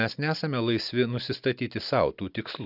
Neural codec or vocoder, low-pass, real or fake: none; 5.4 kHz; real